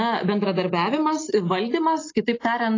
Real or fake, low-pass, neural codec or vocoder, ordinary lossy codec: real; 7.2 kHz; none; AAC, 32 kbps